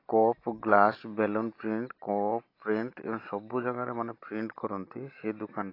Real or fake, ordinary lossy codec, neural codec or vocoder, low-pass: real; AAC, 32 kbps; none; 5.4 kHz